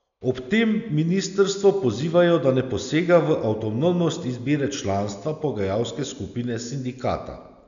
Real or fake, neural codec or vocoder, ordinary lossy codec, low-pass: real; none; none; 7.2 kHz